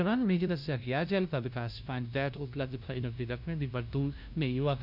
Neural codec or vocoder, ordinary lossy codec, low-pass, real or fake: codec, 16 kHz, 0.5 kbps, FunCodec, trained on LibriTTS, 25 frames a second; none; 5.4 kHz; fake